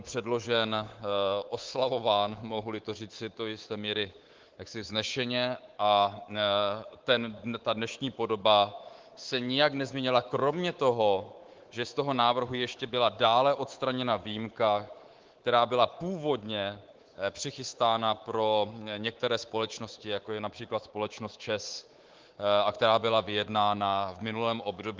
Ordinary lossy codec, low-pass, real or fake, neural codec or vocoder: Opus, 16 kbps; 7.2 kHz; fake; codec, 24 kHz, 3.1 kbps, DualCodec